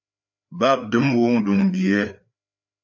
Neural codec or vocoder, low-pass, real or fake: codec, 16 kHz, 4 kbps, FreqCodec, larger model; 7.2 kHz; fake